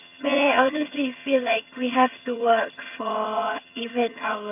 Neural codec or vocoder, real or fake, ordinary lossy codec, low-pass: vocoder, 22.05 kHz, 80 mel bands, HiFi-GAN; fake; none; 3.6 kHz